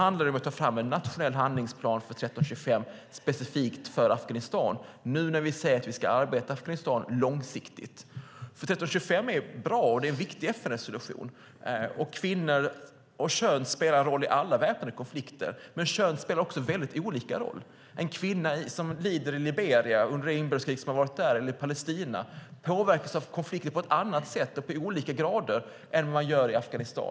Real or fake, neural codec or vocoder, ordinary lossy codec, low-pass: real; none; none; none